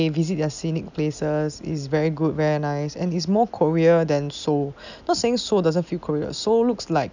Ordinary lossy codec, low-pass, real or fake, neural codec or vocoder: none; 7.2 kHz; real; none